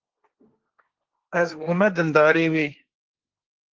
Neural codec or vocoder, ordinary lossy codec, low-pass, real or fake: codec, 16 kHz, 1.1 kbps, Voila-Tokenizer; Opus, 16 kbps; 7.2 kHz; fake